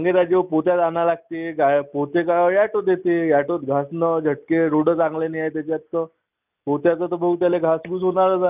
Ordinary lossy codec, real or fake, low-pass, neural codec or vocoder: none; real; 3.6 kHz; none